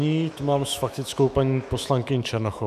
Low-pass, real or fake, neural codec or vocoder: 14.4 kHz; fake; autoencoder, 48 kHz, 128 numbers a frame, DAC-VAE, trained on Japanese speech